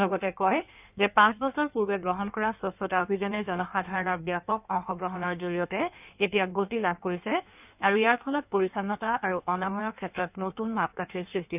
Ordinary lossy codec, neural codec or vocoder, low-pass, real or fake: none; codec, 16 kHz in and 24 kHz out, 1.1 kbps, FireRedTTS-2 codec; 3.6 kHz; fake